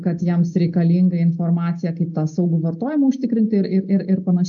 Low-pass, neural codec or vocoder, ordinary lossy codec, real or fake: 7.2 kHz; none; AAC, 64 kbps; real